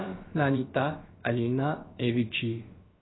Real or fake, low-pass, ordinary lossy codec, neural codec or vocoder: fake; 7.2 kHz; AAC, 16 kbps; codec, 16 kHz, about 1 kbps, DyCAST, with the encoder's durations